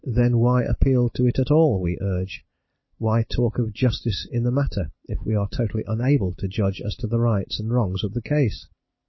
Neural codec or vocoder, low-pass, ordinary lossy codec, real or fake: none; 7.2 kHz; MP3, 24 kbps; real